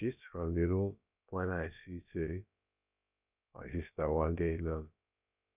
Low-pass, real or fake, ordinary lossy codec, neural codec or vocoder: 3.6 kHz; fake; none; codec, 16 kHz, about 1 kbps, DyCAST, with the encoder's durations